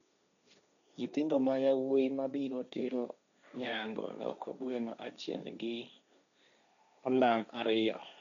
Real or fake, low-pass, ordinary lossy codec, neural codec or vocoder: fake; 7.2 kHz; none; codec, 16 kHz, 1.1 kbps, Voila-Tokenizer